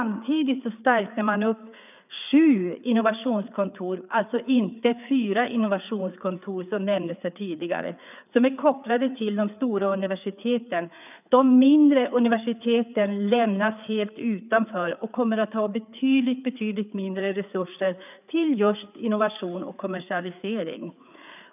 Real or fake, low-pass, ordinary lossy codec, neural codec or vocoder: fake; 3.6 kHz; none; codec, 16 kHz, 4 kbps, FreqCodec, larger model